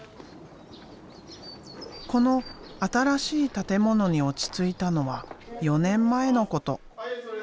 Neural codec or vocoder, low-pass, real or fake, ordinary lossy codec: none; none; real; none